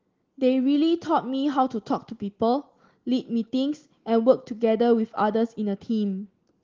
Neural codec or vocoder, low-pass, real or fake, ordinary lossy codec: none; 7.2 kHz; real; Opus, 16 kbps